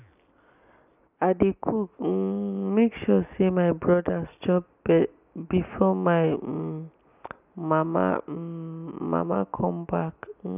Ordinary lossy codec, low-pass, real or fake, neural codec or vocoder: none; 3.6 kHz; real; none